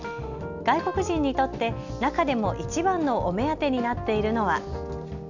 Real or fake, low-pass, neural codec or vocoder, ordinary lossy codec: real; 7.2 kHz; none; none